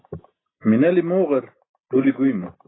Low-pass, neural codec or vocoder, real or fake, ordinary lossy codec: 7.2 kHz; none; real; AAC, 16 kbps